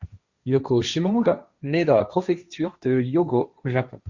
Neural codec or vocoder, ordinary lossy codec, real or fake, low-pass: codec, 16 kHz, 1.1 kbps, Voila-Tokenizer; Opus, 64 kbps; fake; 7.2 kHz